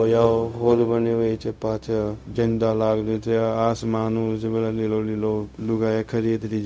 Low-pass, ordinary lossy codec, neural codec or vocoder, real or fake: none; none; codec, 16 kHz, 0.4 kbps, LongCat-Audio-Codec; fake